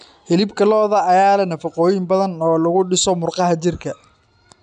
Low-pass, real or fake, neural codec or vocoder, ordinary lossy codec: 9.9 kHz; real; none; AAC, 96 kbps